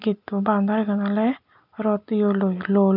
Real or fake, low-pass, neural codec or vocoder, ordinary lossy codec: real; 5.4 kHz; none; none